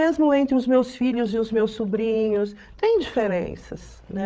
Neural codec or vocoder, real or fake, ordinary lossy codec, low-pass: codec, 16 kHz, 8 kbps, FreqCodec, larger model; fake; none; none